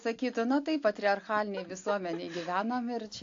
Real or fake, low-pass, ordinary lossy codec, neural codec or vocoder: real; 7.2 kHz; AAC, 48 kbps; none